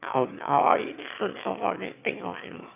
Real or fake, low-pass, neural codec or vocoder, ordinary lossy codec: fake; 3.6 kHz; autoencoder, 22.05 kHz, a latent of 192 numbers a frame, VITS, trained on one speaker; none